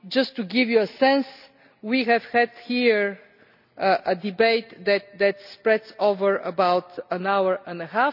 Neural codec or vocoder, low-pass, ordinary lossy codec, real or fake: none; 5.4 kHz; none; real